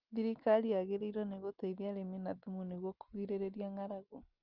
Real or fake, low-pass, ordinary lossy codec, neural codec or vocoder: real; 5.4 kHz; Opus, 24 kbps; none